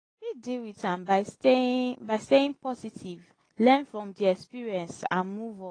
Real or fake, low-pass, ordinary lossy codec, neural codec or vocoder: real; 9.9 kHz; AAC, 32 kbps; none